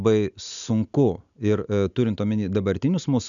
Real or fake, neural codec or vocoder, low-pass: real; none; 7.2 kHz